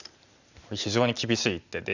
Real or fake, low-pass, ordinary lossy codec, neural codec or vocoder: real; 7.2 kHz; none; none